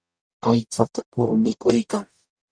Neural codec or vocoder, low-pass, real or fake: codec, 44.1 kHz, 0.9 kbps, DAC; 9.9 kHz; fake